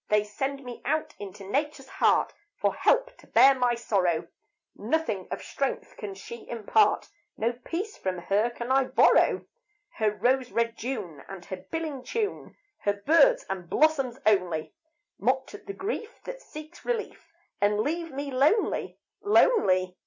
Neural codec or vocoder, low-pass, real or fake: none; 7.2 kHz; real